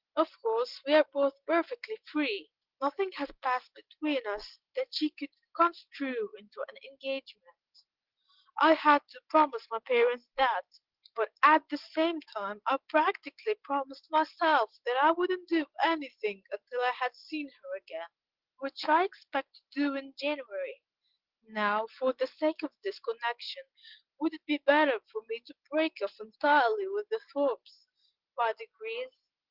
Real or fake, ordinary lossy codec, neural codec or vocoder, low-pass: real; Opus, 16 kbps; none; 5.4 kHz